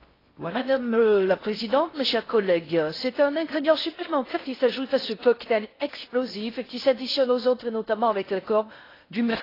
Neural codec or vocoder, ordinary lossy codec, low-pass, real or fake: codec, 16 kHz in and 24 kHz out, 0.6 kbps, FocalCodec, streaming, 4096 codes; AAC, 24 kbps; 5.4 kHz; fake